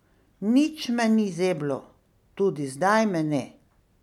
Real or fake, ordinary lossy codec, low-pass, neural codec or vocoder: real; none; 19.8 kHz; none